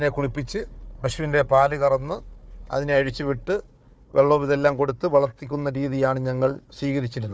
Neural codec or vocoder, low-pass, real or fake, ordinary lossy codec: codec, 16 kHz, 4 kbps, FunCodec, trained on Chinese and English, 50 frames a second; none; fake; none